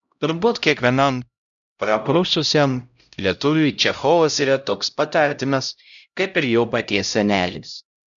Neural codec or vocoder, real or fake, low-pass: codec, 16 kHz, 0.5 kbps, X-Codec, HuBERT features, trained on LibriSpeech; fake; 7.2 kHz